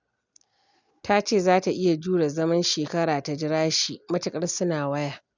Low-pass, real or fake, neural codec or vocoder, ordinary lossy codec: 7.2 kHz; real; none; none